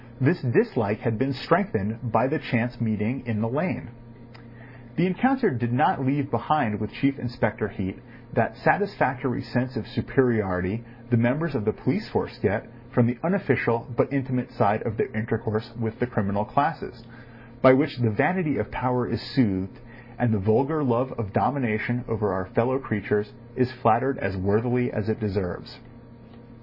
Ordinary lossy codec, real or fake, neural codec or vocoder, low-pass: MP3, 24 kbps; real; none; 5.4 kHz